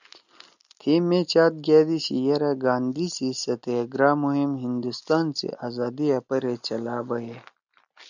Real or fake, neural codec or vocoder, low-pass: real; none; 7.2 kHz